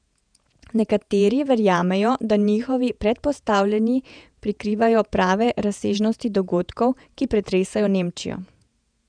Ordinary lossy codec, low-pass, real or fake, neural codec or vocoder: none; 9.9 kHz; fake; vocoder, 48 kHz, 128 mel bands, Vocos